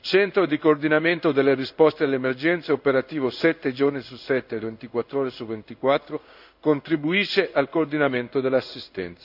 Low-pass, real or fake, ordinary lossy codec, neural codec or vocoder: 5.4 kHz; fake; none; codec, 16 kHz in and 24 kHz out, 1 kbps, XY-Tokenizer